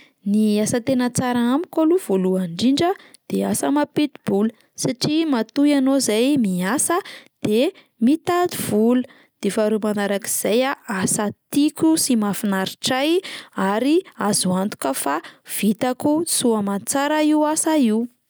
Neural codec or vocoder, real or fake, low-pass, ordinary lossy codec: none; real; none; none